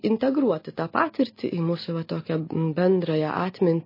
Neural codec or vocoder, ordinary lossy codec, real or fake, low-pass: none; MP3, 24 kbps; real; 5.4 kHz